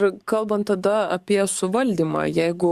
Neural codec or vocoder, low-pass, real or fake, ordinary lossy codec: codec, 44.1 kHz, 7.8 kbps, DAC; 14.4 kHz; fake; Opus, 64 kbps